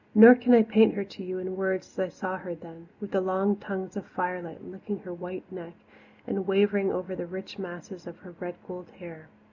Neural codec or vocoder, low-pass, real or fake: none; 7.2 kHz; real